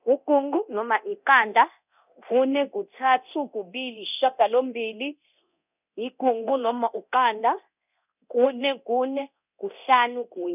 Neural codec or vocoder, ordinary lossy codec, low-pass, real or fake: codec, 24 kHz, 0.9 kbps, DualCodec; none; 3.6 kHz; fake